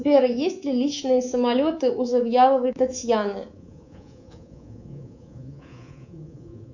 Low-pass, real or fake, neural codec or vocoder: 7.2 kHz; fake; codec, 24 kHz, 3.1 kbps, DualCodec